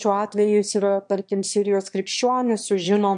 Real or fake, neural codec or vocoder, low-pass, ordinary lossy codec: fake; autoencoder, 22.05 kHz, a latent of 192 numbers a frame, VITS, trained on one speaker; 9.9 kHz; MP3, 64 kbps